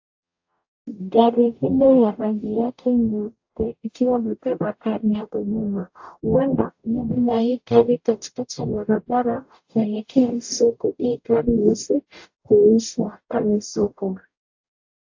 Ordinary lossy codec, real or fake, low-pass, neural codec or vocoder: AAC, 48 kbps; fake; 7.2 kHz; codec, 44.1 kHz, 0.9 kbps, DAC